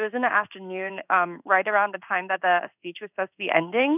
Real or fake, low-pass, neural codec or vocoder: fake; 3.6 kHz; codec, 16 kHz in and 24 kHz out, 1 kbps, XY-Tokenizer